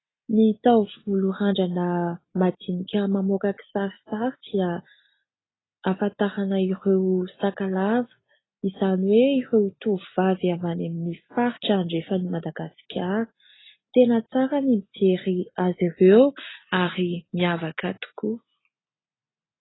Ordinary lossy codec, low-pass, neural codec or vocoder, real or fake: AAC, 16 kbps; 7.2 kHz; none; real